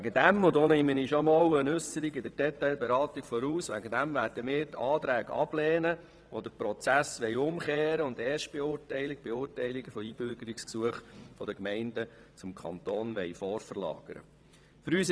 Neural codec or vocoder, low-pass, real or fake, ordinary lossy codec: vocoder, 22.05 kHz, 80 mel bands, WaveNeXt; none; fake; none